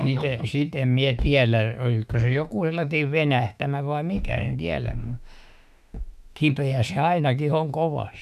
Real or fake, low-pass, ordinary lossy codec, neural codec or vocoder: fake; 14.4 kHz; none; autoencoder, 48 kHz, 32 numbers a frame, DAC-VAE, trained on Japanese speech